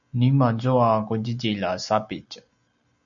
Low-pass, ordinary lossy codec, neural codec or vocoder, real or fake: 7.2 kHz; MP3, 96 kbps; none; real